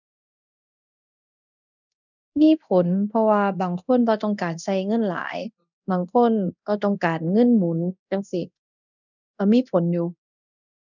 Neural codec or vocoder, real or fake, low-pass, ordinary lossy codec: codec, 24 kHz, 0.9 kbps, DualCodec; fake; 7.2 kHz; none